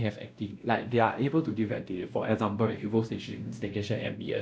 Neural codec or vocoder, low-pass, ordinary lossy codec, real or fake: codec, 16 kHz, 1 kbps, X-Codec, WavLM features, trained on Multilingual LibriSpeech; none; none; fake